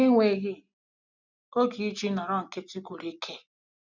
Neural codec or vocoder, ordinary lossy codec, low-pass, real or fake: none; none; 7.2 kHz; real